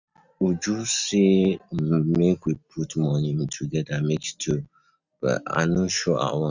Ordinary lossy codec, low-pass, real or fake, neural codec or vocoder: none; 7.2 kHz; real; none